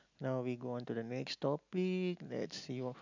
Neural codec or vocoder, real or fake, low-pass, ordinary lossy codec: none; real; 7.2 kHz; none